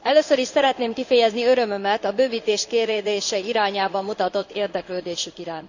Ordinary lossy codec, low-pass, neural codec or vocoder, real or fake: none; 7.2 kHz; codec, 16 kHz in and 24 kHz out, 1 kbps, XY-Tokenizer; fake